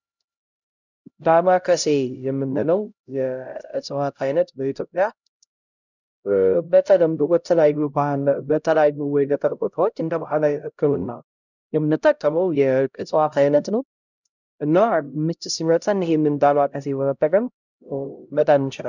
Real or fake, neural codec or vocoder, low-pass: fake; codec, 16 kHz, 0.5 kbps, X-Codec, HuBERT features, trained on LibriSpeech; 7.2 kHz